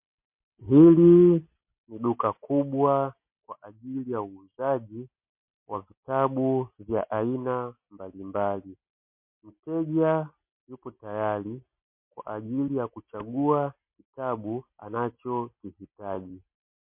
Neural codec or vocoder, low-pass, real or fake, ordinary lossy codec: none; 3.6 kHz; real; MP3, 32 kbps